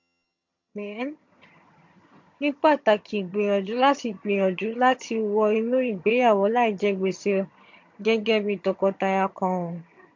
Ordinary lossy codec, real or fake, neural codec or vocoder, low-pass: MP3, 48 kbps; fake; vocoder, 22.05 kHz, 80 mel bands, HiFi-GAN; 7.2 kHz